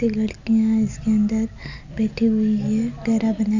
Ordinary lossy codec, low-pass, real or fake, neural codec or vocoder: AAC, 48 kbps; 7.2 kHz; real; none